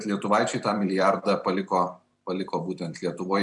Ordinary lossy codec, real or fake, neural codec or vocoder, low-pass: MP3, 96 kbps; fake; vocoder, 44.1 kHz, 128 mel bands every 256 samples, BigVGAN v2; 10.8 kHz